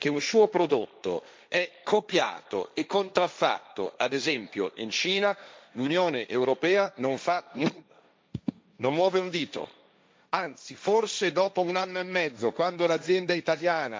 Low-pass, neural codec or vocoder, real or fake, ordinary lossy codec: none; codec, 16 kHz, 1.1 kbps, Voila-Tokenizer; fake; none